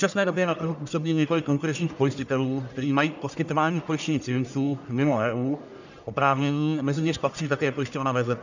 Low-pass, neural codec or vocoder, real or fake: 7.2 kHz; codec, 44.1 kHz, 1.7 kbps, Pupu-Codec; fake